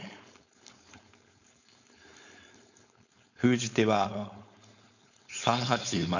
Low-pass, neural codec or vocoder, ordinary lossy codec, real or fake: 7.2 kHz; codec, 16 kHz, 4.8 kbps, FACodec; none; fake